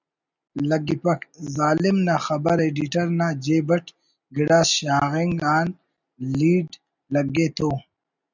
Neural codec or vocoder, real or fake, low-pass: none; real; 7.2 kHz